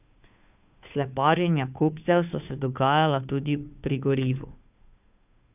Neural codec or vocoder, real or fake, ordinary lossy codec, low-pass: codec, 16 kHz, 2 kbps, FunCodec, trained on Chinese and English, 25 frames a second; fake; none; 3.6 kHz